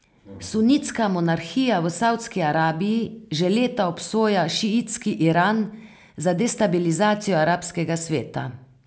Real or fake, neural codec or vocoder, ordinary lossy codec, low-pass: real; none; none; none